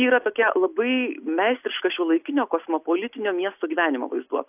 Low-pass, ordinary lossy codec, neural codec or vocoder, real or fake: 3.6 kHz; AAC, 32 kbps; none; real